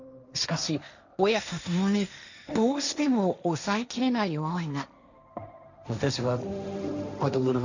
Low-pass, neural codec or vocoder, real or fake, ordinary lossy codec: 7.2 kHz; codec, 16 kHz, 1.1 kbps, Voila-Tokenizer; fake; none